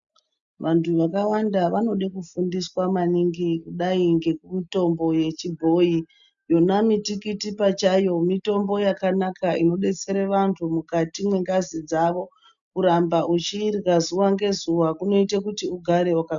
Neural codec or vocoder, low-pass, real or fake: none; 7.2 kHz; real